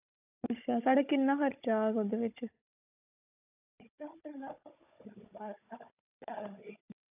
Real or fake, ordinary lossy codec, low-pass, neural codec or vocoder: fake; AAC, 32 kbps; 3.6 kHz; codec, 16 kHz, 16 kbps, FunCodec, trained on Chinese and English, 50 frames a second